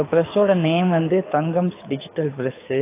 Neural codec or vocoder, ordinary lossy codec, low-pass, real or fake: codec, 24 kHz, 6 kbps, HILCodec; AAC, 24 kbps; 3.6 kHz; fake